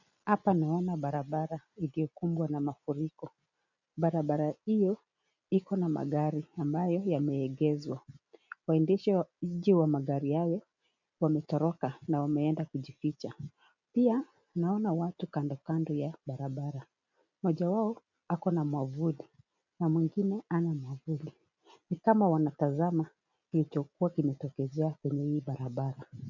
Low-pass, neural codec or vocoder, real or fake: 7.2 kHz; none; real